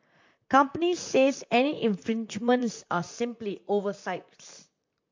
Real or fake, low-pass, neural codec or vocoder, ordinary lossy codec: fake; 7.2 kHz; vocoder, 44.1 kHz, 128 mel bands, Pupu-Vocoder; MP3, 48 kbps